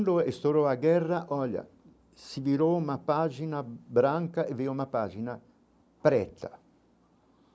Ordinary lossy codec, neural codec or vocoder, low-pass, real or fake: none; none; none; real